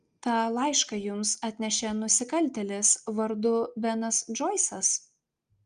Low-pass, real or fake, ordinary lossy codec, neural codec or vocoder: 9.9 kHz; real; Opus, 32 kbps; none